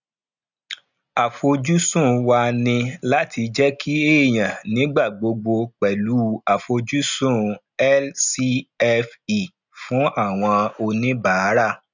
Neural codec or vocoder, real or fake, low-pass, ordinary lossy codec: none; real; 7.2 kHz; none